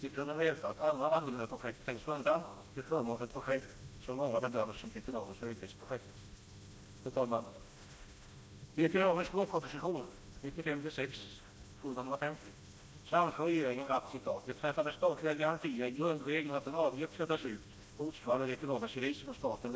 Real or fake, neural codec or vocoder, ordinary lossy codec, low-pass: fake; codec, 16 kHz, 1 kbps, FreqCodec, smaller model; none; none